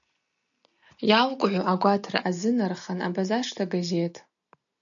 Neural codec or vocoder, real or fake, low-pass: none; real; 7.2 kHz